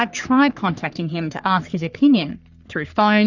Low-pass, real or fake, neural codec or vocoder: 7.2 kHz; fake; codec, 44.1 kHz, 3.4 kbps, Pupu-Codec